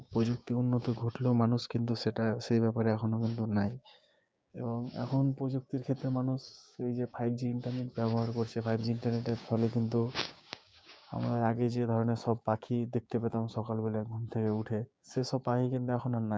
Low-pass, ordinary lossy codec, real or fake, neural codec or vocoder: none; none; fake; codec, 16 kHz, 6 kbps, DAC